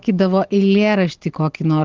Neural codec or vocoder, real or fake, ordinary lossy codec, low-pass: none; real; Opus, 16 kbps; 7.2 kHz